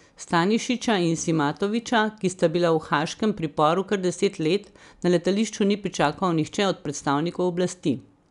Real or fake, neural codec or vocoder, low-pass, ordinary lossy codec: real; none; 10.8 kHz; none